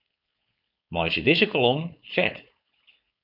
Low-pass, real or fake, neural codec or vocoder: 5.4 kHz; fake; codec, 16 kHz, 4.8 kbps, FACodec